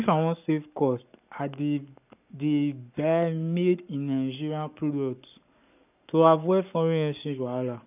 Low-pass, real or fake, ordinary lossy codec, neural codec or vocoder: 3.6 kHz; fake; none; codec, 44.1 kHz, 7.8 kbps, Pupu-Codec